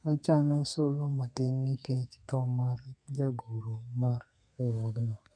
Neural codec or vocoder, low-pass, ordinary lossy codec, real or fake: codec, 32 kHz, 1.9 kbps, SNAC; 9.9 kHz; none; fake